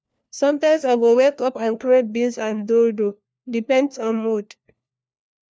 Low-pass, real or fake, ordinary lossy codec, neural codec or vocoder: none; fake; none; codec, 16 kHz, 1 kbps, FunCodec, trained on LibriTTS, 50 frames a second